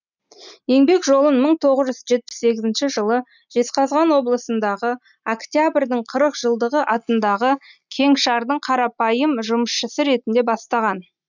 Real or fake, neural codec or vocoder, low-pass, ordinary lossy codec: real; none; 7.2 kHz; none